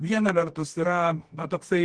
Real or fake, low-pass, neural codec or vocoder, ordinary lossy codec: fake; 9.9 kHz; codec, 24 kHz, 0.9 kbps, WavTokenizer, medium music audio release; Opus, 16 kbps